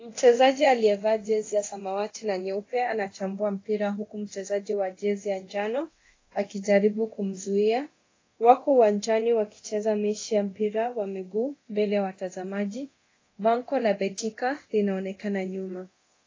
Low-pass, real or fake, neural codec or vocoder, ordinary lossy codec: 7.2 kHz; fake; codec, 24 kHz, 0.9 kbps, DualCodec; AAC, 32 kbps